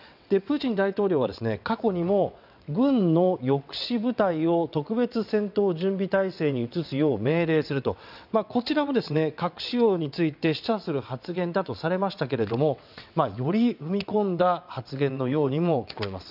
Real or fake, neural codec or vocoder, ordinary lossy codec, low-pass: fake; vocoder, 22.05 kHz, 80 mel bands, WaveNeXt; none; 5.4 kHz